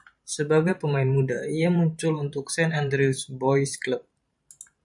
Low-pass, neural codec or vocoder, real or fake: 10.8 kHz; vocoder, 44.1 kHz, 128 mel bands every 512 samples, BigVGAN v2; fake